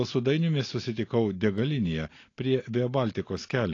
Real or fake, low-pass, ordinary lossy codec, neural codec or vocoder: real; 7.2 kHz; AAC, 32 kbps; none